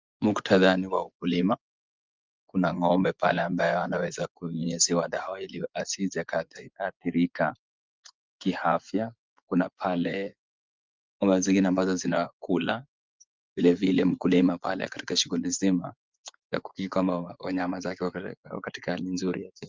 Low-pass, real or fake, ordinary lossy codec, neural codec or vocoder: 7.2 kHz; fake; Opus, 24 kbps; vocoder, 24 kHz, 100 mel bands, Vocos